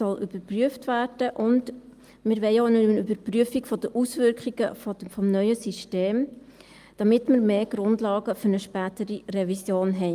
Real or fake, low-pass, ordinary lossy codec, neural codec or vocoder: real; 14.4 kHz; Opus, 32 kbps; none